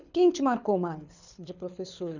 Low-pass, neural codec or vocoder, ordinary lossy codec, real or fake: 7.2 kHz; codec, 24 kHz, 6 kbps, HILCodec; none; fake